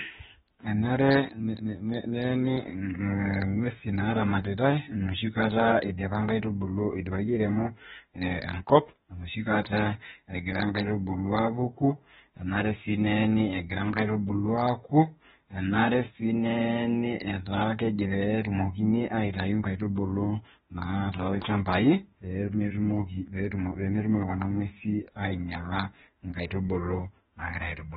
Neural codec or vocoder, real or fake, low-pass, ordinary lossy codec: autoencoder, 48 kHz, 32 numbers a frame, DAC-VAE, trained on Japanese speech; fake; 19.8 kHz; AAC, 16 kbps